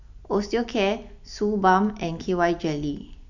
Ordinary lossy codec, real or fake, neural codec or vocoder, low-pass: none; fake; vocoder, 44.1 kHz, 128 mel bands every 256 samples, BigVGAN v2; 7.2 kHz